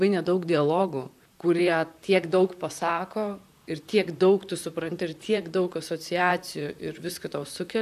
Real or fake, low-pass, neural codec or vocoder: fake; 14.4 kHz; vocoder, 44.1 kHz, 128 mel bands, Pupu-Vocoder